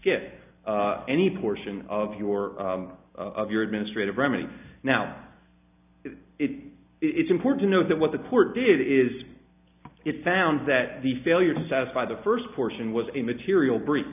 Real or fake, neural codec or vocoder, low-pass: real; none; 3.6 kHz